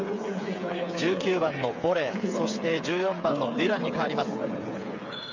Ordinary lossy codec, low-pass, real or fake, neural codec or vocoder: MP3, 48 kbps; 7.2 kHz; fake; codec, 16 kHz, 8 kbps, FreqCodec, smaller model